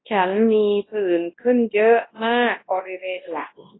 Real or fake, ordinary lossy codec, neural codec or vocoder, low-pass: fake; AAC, 16 kbps; codec, 24 kHz, 0.9 kbps, WavTokenizer, large speech release; 7.2 kHz